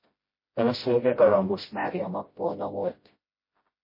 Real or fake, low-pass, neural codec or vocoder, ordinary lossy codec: fake; 5.4 kHz; codec, 16 kHz, 0.5 kbps, FreqCodec, smaller model; MP3, 24 kbps